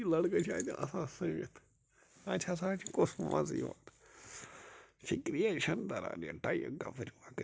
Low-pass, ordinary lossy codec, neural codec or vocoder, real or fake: none; none; none; real